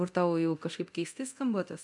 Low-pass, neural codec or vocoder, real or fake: 10.8 kHz; codec, 24 kHz, 0.9 kbps, DualCodec; fake